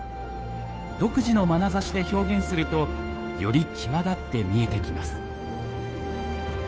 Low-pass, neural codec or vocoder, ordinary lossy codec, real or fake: none; codec, 16 kHz, 2 kbps, FunCodec, trained on Chinese and English, 25 frames a second; none; fake